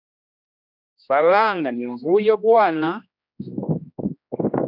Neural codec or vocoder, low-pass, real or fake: codec, 16 kHz, 1 kbps, X-Codec, HuBERT features, trained on general audio; 5.4 kHz; fake